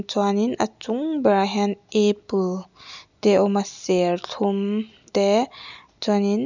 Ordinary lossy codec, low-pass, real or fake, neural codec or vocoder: none; 7.2 kHz; real; none